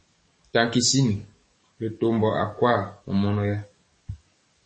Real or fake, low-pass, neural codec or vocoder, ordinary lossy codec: fake; 9.9 kHz; codec, 44.1 kHz, 7.8 kbps, DAC; MP3, 32 kbps